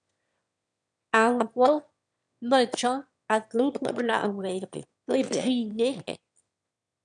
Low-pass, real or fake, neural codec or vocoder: 9.9 kHz; fake; autoencoder, 22.05 kHz, a latent of 192 numbers a frame, VITS, trained on one speaker